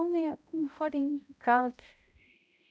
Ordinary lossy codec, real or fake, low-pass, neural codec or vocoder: none; fake; none; codec, 16 kHz, 0.5 kbps, X-Codec, HuBERT features, trained on balanced general audio